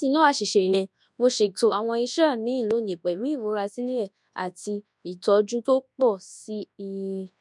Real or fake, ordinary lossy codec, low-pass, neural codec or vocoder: fake; none; 10.8 kHz; codec, 24 kHz, 0.9 kbps, WavTokenizer, large speech release